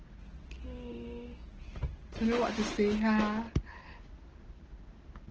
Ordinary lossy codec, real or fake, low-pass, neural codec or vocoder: Opus, 16 kbps; real; 7.2 kHz; none